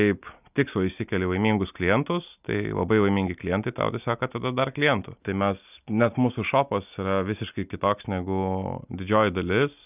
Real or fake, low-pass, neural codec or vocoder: real; 3.6 kHz; none